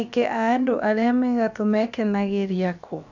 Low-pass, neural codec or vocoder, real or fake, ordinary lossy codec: 7.2 kHz; codec, 16 kHz, about 1 kbps, DyCAST, with the encoder's durations; fake; none